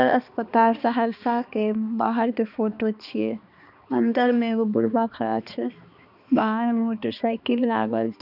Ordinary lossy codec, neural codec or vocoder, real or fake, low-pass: none; codec, 16 kHz, 2 kbps, X-Codec, HuBERT features, trained on balanced general audio; fake; 5.4 kHz